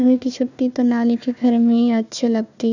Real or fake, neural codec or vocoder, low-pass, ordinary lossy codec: fake; codec, 16 kHz, 1 kbps, FunCodec, trained on Chinese and English, 50 frames a second; 7.2 kHz; none